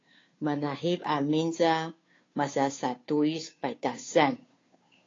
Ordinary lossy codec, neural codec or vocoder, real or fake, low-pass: AAC, 32 kbps; codec, 16 kHz, 2 kbps, FunCodec, trained on Chinese and English, 25 frames a second; fake; 7.2 kHz